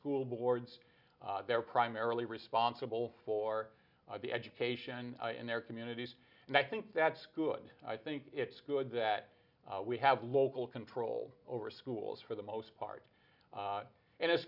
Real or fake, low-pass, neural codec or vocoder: real; 5.4 kHz; none